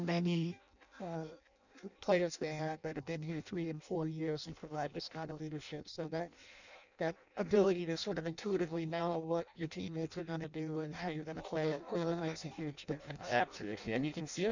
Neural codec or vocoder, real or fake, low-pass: codec, 16 kHz in and 24 kHz out, 0.6 kbps, FireRedTTS-2 codec; fake; 7.2 kHz